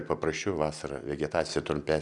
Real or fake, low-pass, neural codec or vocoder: real; 10.8 kHz; none